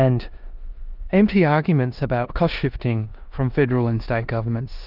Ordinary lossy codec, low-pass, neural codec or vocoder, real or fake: Opus, 32 kbps; 5.4 kHz; codec, 16 kHz in and 24 kHz out, 0.9 kbps, LongCat-Audio-Codec, four codebook decoder; fake